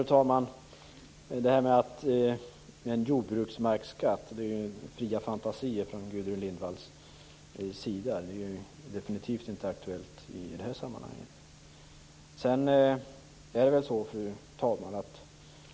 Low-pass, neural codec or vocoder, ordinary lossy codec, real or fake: none; none; none; real